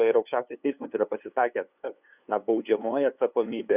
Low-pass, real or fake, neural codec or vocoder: 3.6 kHz; fake; codec, 16 kHz, 2 kbps, FunCodec, trained on LibriTTS, 25 frames a second